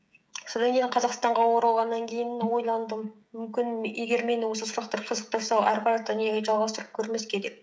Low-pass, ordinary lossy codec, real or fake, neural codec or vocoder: none; none; fake; codec, 16 kHz, 16 kbps, FreqCodec, smaller model